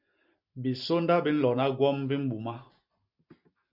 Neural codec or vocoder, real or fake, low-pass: none; real; 5.4 kHz